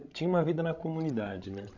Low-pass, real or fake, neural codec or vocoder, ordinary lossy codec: 7.2 kHz; fake; codec, 16 kHz, 16 kbps, FreqCodec, larger model; none